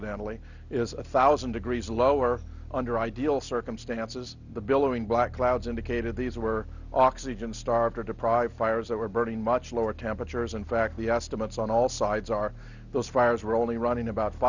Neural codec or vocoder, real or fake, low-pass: none; real; 7.2 kHz